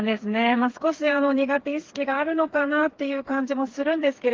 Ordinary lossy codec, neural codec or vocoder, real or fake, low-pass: Opus, 16 kbps; codec, 16 kHz, 4 kbps, FreqCodec, smaller model; fake; 7.2 kHz